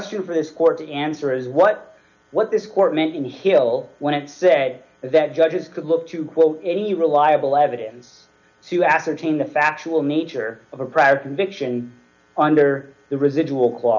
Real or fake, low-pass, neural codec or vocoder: real; 7.2 kHz; none